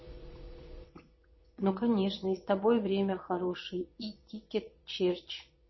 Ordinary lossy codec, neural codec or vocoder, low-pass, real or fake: MP3, 24 kbps; vocoder, 22.05 kHz, 80 mel bands, Vocos; 7.2 kHz; fake